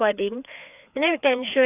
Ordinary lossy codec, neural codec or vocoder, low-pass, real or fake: none; codec, 16 kHz, 2 kbps, FreqCodec, larger model; 3.6 kHz; fake